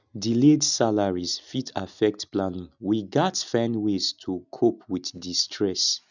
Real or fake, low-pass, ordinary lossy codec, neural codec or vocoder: real; 7.2 kHz; none; none